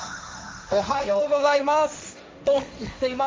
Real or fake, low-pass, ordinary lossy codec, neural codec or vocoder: fake; none; none; codec, 16 kHz, 1.1 kbps, Voila-Tokenizer